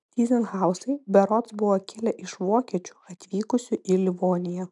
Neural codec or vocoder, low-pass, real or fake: none; 10.8 kHz; real